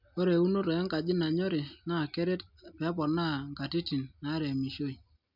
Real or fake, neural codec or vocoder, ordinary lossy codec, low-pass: real; none; none; 5.4 kHz